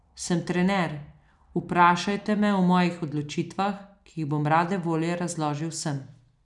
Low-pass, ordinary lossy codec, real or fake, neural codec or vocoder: 10.8 kHz; none; real; none